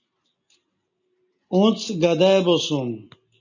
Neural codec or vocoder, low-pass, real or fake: none; 7.2 kHz; real